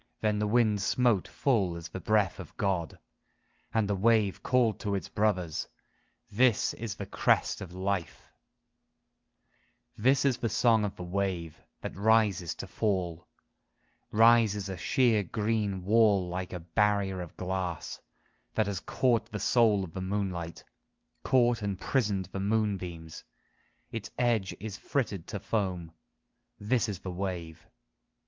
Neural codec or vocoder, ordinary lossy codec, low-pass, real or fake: none; Opus, 24 kbps; 7.2 kHz; real